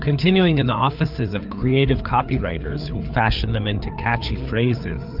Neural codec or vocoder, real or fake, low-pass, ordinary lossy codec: codec, 16 kHz, 4 kbps, FreqCodec, larger model; fake; 5.4 kHz; Opus, 24 kbps